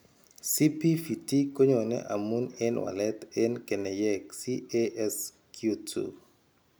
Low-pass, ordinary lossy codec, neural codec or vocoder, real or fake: none; none; none; real